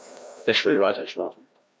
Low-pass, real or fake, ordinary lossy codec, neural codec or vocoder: none; fake; none; codec, 16 kHz, 1 kbps, FreqCodec, larger model